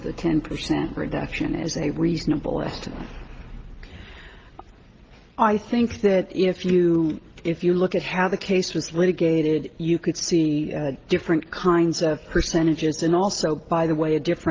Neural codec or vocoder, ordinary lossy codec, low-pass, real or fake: none; Opus, 16 kbps; 7.2 kHz; real